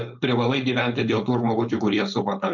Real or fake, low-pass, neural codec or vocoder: fake; 7.2 kHz; codec, 16 kHz, 4.8 kbps, FACodec